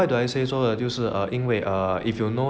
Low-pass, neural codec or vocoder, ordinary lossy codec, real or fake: none; none; none; real